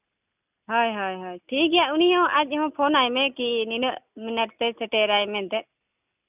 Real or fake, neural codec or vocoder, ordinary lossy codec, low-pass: real; none; none; 3.6 kHz